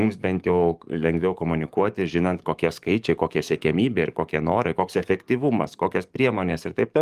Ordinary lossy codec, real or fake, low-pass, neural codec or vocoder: Opus, 32 kbps; fake; 14.4 kHz; codec, 44.1 kHz, 7.8 kbps, DAC